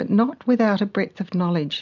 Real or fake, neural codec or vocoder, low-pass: real; none; 7.2 kHz